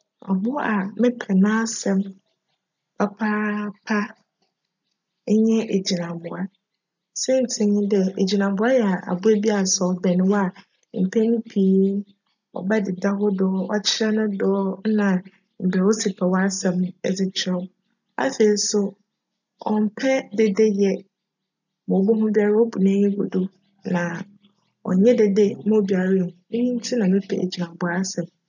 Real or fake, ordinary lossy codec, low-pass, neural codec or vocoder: real; none; 7.2 kHz; none